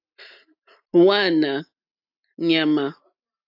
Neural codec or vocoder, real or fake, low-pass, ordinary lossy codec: none; real; 5.4 kHz; AAC, 48 kbps